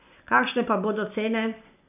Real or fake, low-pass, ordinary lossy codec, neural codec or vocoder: fake; 3.6 kHz; none; vocoder, 44.1 kHz, 128 mel bands every 512 samples, BigVGAN v2